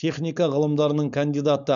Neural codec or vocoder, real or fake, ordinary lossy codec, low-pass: codec, 16 kHz, 4.8 kbps, FACodec; fake; none; 7.2 kHz